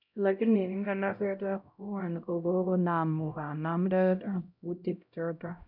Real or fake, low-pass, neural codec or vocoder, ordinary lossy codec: fake; 5.4 kHz; codec, 16 kHz, 0.5 kbps, X-Codec, HuBERT features, trained on LibriSpeech; none